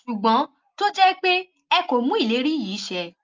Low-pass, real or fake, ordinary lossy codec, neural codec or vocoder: 7.2 kHz; real; Opus, 24 kbps; none